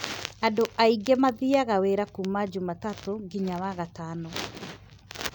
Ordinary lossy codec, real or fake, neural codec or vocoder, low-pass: none; real; none; none